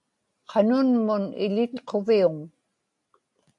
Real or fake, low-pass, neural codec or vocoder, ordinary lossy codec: real; 10.8 kHz; none; AAC, 64 kbps